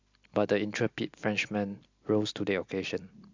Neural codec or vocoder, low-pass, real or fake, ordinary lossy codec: none; 7.2 kHz; real; MP3, 64 kbps